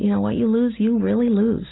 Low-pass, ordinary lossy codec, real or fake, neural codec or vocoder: 7.2 kHz; AAC, 16 kbps; real; none